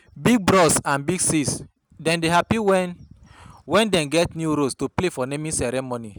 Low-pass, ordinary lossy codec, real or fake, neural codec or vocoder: none; none; real; none